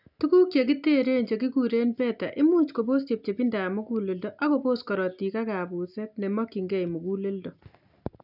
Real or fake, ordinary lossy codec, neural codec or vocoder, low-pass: real; none; none; 5.4 kHz